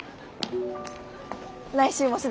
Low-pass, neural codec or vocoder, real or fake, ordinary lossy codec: none; none; real; none